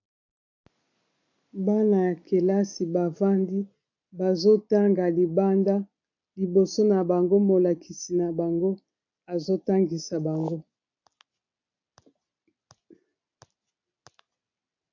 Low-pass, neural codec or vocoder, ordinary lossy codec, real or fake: 7.2 kHz; none; AAC, 48 kbps; real